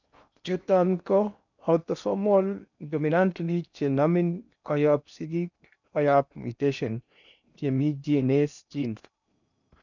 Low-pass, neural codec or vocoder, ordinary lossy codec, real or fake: 7.2 kHz; codec, 16 kHz in and 24 kHz out, 0.8 kbps, FocalCodec, streaming, 65536 codes; none; fake